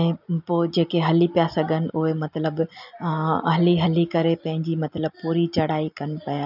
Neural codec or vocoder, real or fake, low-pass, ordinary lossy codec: none; real; 5.4 kHz; none